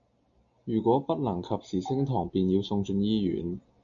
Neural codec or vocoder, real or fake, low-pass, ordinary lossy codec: none; real; 7.2 kHz; MP3, 96 kbps